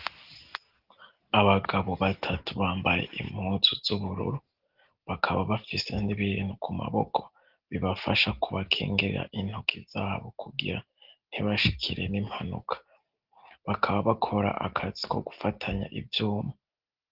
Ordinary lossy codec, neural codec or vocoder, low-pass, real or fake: Opus, 16 kbps; none; 5.4 kHz; real